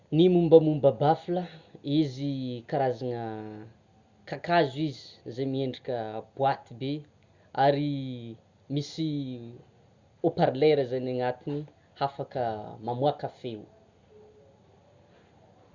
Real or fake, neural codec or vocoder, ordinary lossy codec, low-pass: real; none; Opus, 64 kbps; 7.2 kHz